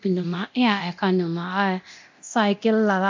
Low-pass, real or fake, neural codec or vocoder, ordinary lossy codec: 7.2 kHz; fake; codec, 24 kHz, 0.9 kbps, DualCodec; MP3, 64 kbps